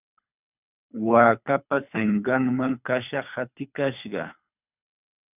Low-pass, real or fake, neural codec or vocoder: 3.6 kHz; fake; codec, 24 kHz, 3 kbps, HILCodec